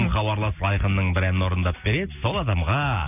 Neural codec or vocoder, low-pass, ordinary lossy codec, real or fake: none; 3.6 kHz; none; real